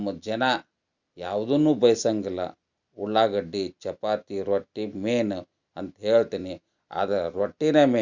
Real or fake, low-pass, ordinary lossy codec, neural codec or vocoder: real; 7.2 kHz; Opus, 64 kbps; none